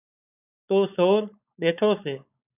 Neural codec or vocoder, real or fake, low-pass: codec, 16 kHz, 4.8 kbps, FACodec; fake; 3.6 kHz